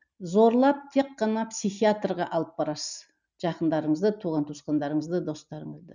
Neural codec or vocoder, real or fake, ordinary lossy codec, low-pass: none; real; none; 7.2 kHz